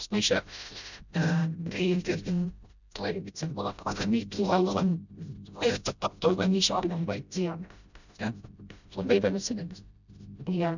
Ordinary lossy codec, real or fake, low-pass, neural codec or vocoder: none; fake; 7.2 kHz; codec, 16 kHz, 0.5 kbps, FreqCodec, smaller model